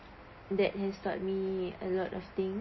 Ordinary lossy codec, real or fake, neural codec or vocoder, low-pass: MP3, 24 kbps; real; none; 7.2 kHz